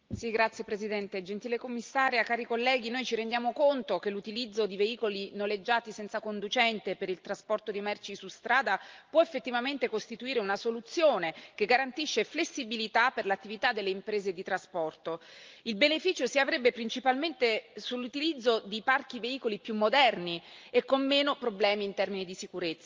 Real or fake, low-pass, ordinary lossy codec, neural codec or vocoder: real; 7.2 kHz; Opus, 32 kbps; none